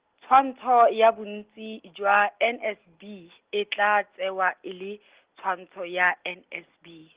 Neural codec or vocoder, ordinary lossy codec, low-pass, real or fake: none; Opus, 16 kbps; 3.6 kHz; real